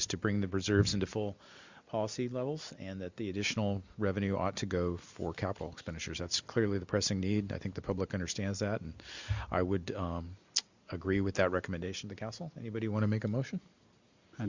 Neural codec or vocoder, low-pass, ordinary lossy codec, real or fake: none; 7.2 kHz; Opus, 64 kbps; real